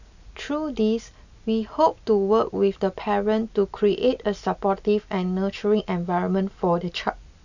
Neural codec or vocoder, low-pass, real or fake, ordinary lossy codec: none; 7.2 kHz; real; none